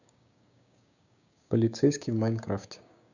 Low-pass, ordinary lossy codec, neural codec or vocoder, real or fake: 7.2 kHz; none; codec, 16 kHz, 6 kbps, DAC; fake